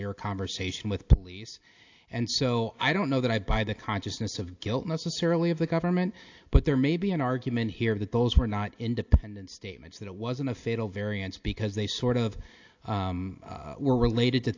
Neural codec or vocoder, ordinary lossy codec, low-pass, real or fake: none; AAC, 48 kbps; 7.2 kHz; real